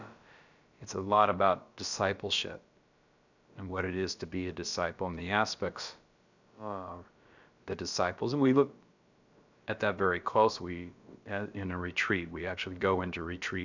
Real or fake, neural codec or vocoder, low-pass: fake; codec, 16 kHz, about 1 kbps, DyCAST, with the encoder's durations; 7.2 kHz